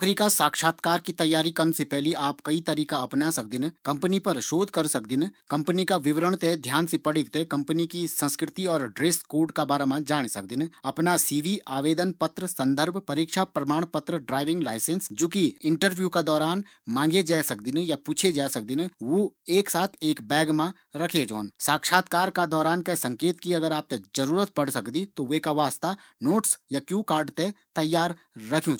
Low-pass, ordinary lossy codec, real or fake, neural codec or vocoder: none; none; fake; codec, 44.1 kHz, 7.8 kbps, DAC